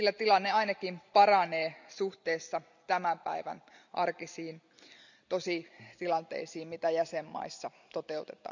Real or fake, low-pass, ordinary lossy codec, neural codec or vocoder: real; 7.2 kHz; none; none